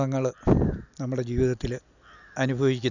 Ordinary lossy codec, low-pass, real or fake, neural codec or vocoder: none; 7.2 kHz; real; none